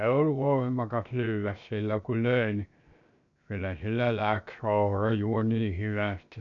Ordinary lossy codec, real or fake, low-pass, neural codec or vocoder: none; fake; 7.2 kHz; codec, 16 kHz, 0.7 kbps, FocalCodec